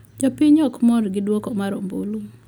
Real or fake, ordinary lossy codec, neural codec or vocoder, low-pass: real; none; none; 19.8 kHz